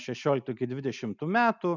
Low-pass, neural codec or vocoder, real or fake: 7.2 kHz; none; real